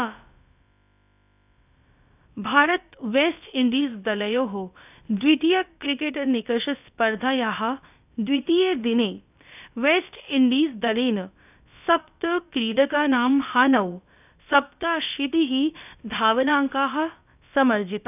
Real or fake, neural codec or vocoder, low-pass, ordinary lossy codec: fake; codec, 16 kHz, about 1 kbps, DyCAST, with the encoder's durations; 3.6 kHz; none